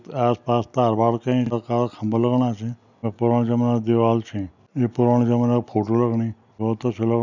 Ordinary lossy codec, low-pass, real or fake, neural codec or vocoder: none; 7.2 kHz; real; none